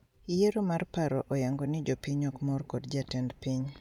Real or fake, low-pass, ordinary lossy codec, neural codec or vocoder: real; 19.8 kHz; none; none